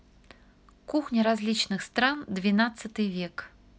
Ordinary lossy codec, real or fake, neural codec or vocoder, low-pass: none; real; none; none